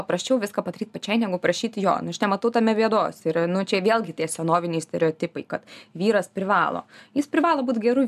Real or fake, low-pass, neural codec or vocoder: real; 14.4 kHz; none